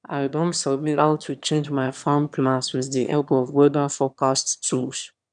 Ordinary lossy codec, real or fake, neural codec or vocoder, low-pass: none; fake; autoencoder, 22.05 kHz, a latent of 192 numbers a frame, VITS, trained on one speaker; 9.9 kHz